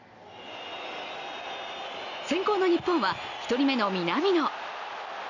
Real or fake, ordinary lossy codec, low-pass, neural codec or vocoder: real; none; 7.2 kHz; none